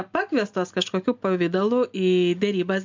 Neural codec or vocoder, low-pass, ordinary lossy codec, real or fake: none; 7.2 kHz; MP3, 64 kbps; real